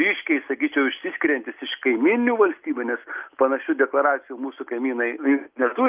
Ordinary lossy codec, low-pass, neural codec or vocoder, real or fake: Opus, 24 kbps; 3.6 kHz; none; real